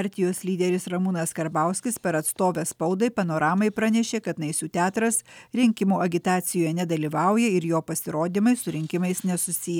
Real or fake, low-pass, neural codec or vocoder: real; 19.8 kHz; none